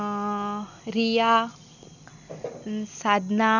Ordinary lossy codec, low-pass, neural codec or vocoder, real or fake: none; 7.2 kHz; none; real